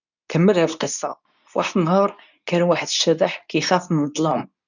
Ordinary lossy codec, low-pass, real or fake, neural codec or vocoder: none; 7.2 kHz; fake; codec, 24 kHz, 0.9 kbps, WavTokenizer, medium speech release version 2